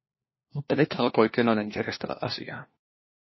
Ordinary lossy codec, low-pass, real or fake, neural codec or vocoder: MP3, 24 kbps; 7.2 kHz; fake; codec, 16 kHz, 1 kbps, FunCodec, trained on LibriTTS, 50 frames a second